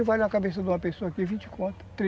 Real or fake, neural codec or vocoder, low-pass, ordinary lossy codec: real; none; none; none